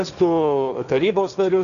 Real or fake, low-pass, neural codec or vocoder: fake; 7.2 kHz; codec, 16 kHz, 1.1 kbps, Voila-Tokenizer